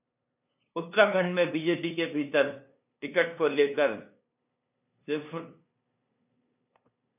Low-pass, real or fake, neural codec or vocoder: 3.6 kHz; fake; codec, 16 kHz, 2 kbps, FunCodec, trained on LibriTTS, 25 frames a second